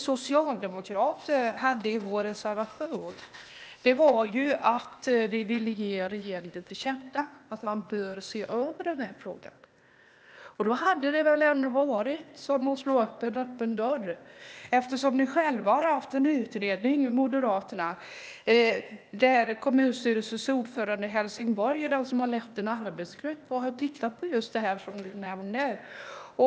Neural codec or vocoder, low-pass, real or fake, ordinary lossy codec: codec, 16 kHz, 0.8 kbps, ZipCodec; none; fake; none